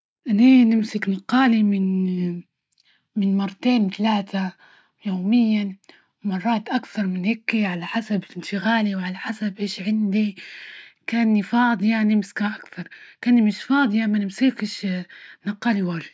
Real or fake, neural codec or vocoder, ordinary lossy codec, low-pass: real; none; none; none